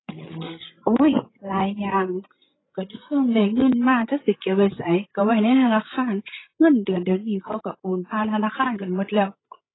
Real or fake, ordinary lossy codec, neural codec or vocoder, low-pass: fake; AAC, 16 kbps; codec, 16 kHz, 16 kbps, FreqCodec, larger model; 7.2 kHz